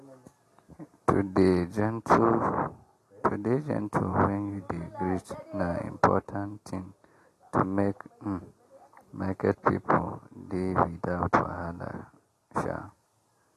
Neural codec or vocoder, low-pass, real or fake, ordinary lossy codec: none; 14.4 kHz; real; AAC, 48 kbps